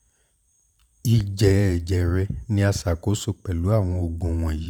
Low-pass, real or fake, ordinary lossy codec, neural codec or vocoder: 19.8 kHz; fake; none; vocoder, 44.1 kHz, 128 mel bands every 512 samples, BigVGAN v2